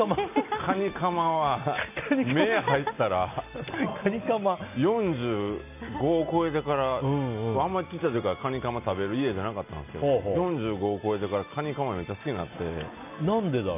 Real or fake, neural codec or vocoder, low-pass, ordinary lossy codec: real; none; 3.6 kHz; none